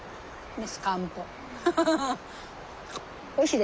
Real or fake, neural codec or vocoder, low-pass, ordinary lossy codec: real; none; none; none